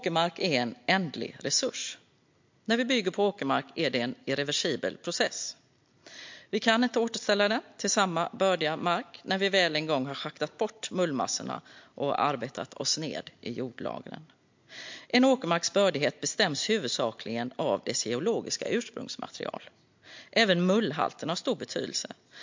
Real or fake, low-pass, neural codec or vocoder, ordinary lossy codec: real; 7.2 kHz; none; MP3, 48 kbps